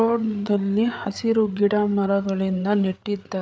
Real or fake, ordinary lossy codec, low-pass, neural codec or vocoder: fake; none; none; codec, 16 kHz, 8 kbps, FreqCodec, larger model